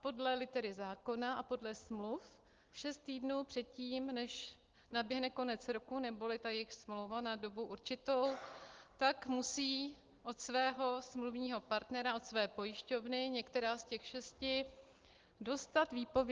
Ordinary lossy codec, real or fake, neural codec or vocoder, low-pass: Opus, 16 kbps; real; none; 7.2 kHz